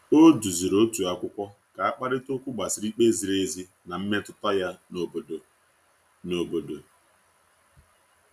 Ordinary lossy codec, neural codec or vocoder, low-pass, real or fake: none; none; 14.4 kHz; real